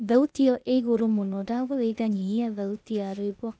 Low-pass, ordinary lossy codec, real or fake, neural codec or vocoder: none; none; fake; codec, 16 kHz, 0.8 kbps, ZipCodec